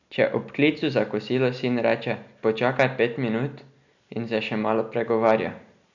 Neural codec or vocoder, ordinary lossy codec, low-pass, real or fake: none; none; 7.2 kHz; real